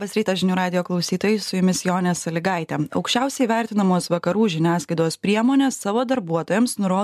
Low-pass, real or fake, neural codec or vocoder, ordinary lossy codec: 14.4 kHz; real; none; MP3, 96 kbps